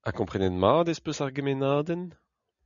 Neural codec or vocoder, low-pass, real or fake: none; 7.2 kHz; real